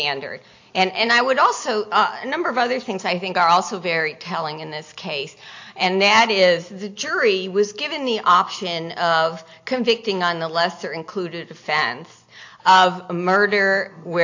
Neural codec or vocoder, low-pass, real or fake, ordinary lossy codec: none; 7.2 kHz; real; AAC, 48 kbps